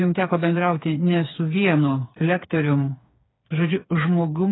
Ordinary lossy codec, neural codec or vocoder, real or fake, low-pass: AAC, 16 kbps; codec, 16 kHz, 4 kbps, FreqCodec, smaller model; fake; 7.2 kHz